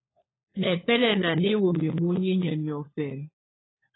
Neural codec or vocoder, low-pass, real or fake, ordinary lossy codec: codec, 16 kHz, 4 kbps, FunCodec, trained on LibriTTS, 50 frames a second; 7.2 kHz; fake; AAC, 16 kbps